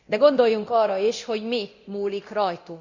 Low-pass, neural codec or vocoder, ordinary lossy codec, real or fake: 7.2 kHz; codec, 24 kHz, 0.9 kbps, DualCodec; Opus, 64 kbps; fake